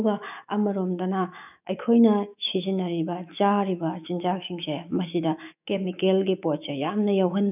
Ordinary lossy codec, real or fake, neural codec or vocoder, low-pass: none; fake; autoencoder, 48 kHz, 128 numbers a frame, DAC-VAE, trained on Japanese speech; 3.6 kHz